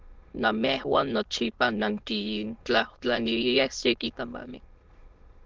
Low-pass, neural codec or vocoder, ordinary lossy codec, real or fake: 7.2 kHz; autoencoder, 22.05 kHz, a latent of 192 numbers a frame, VITS, trained on many speakers; Opus, 16 kbps; fake